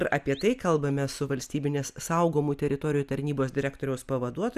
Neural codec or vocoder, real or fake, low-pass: none; real; 14.4 kHz